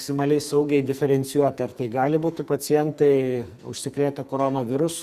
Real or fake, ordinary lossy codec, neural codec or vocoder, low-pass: fake; Opus, 64 kbps; codec, 44.1 kHz, 2.6 kbps, SNAC; 14.4 kHz